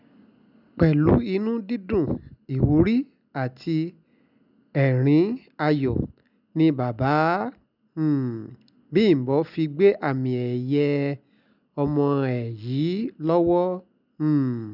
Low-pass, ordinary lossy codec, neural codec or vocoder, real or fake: 5.4 kHz; none; none; real